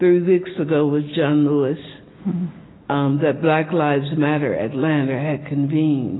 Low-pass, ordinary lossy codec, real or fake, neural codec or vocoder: 7.2 kHz; AAC, 16 kbps; real; none